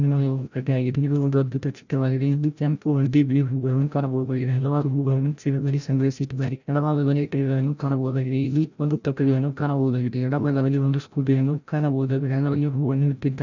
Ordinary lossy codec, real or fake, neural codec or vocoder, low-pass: none; fake; codec, 16 kHz, 0.5 kbps, FreqCodec, larger model; 7.2 kHz